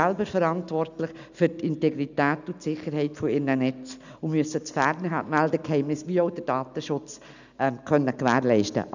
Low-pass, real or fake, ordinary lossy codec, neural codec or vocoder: 7.2 kHz; real; none; none